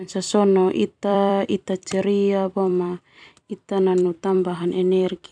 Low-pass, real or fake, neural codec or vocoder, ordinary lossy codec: 9.9 kHz; fake; vocoder, 44.1 kHz, 128 mel bands every 256 samples, BigVGAN v2; none